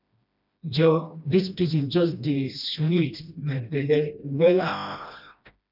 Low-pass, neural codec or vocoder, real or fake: 5.4 kHz; codec, 16 kHz, 1 kbps, FreqCodec, smaller model; fake